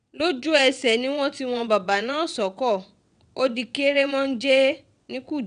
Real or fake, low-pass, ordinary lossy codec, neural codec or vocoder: fake; 9.9 kHz; none; vocoder, 22.05 kHz, 80 mel bands, WaveNeXt